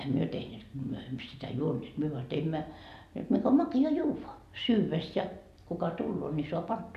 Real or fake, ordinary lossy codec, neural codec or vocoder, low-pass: real; none; none; 14.4 kHz